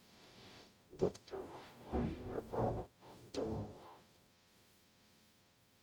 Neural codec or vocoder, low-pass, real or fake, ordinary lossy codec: codec, 44.1 kHz, 0.9 kbps, DAC; none; fake; none